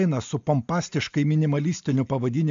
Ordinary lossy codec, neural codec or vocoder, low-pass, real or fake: MP3, 64 kbps; none; 7.2 kHz; real